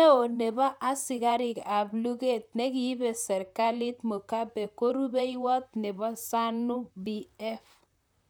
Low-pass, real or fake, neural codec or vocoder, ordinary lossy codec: none; fake; vocoder, 44.1 kHz, 128 mel bands, Pupu-Vocoder; none